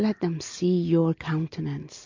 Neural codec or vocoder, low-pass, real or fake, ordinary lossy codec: none; 7.2 kHz; real; MP3, 48 kbps